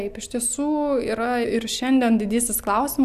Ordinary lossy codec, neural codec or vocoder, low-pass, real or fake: Opus, 64 kbps; none; 14.4 kHz; real